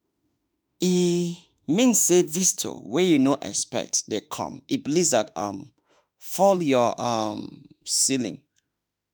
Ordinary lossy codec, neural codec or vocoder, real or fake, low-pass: none; autoencoder, 48 kHz, 32 numbers a frame, DAC-VAE, trained on Japanese speech; fake; none